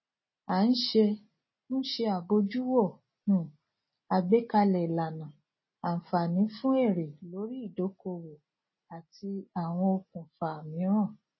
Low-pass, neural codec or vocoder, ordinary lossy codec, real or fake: 7.2 kHz; none; MP3, 24 kbps; real